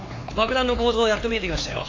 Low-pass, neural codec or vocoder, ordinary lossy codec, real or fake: 7.2 kHz; codec, 16 kHz, 4 kbps, X-Codec, HuBERT features, trained on LibriSpeech; AAC, 32 kbps; fake